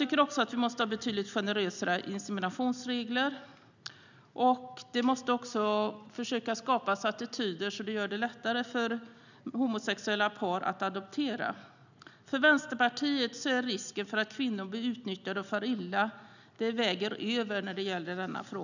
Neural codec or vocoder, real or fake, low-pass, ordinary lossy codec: none; real; 7.2 kHz; none